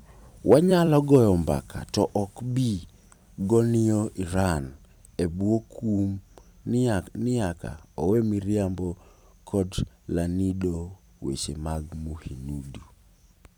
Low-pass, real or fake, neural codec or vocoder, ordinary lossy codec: none; fake; vocoder, 44.1 kHz, 128 mel bands every 256 samples, BigVGAN v2; none